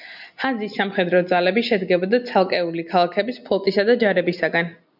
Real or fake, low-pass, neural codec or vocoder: real; 5.4 kHz; none